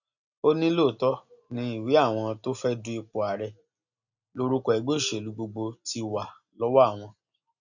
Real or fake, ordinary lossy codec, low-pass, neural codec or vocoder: real; none; 7.2 kHz; none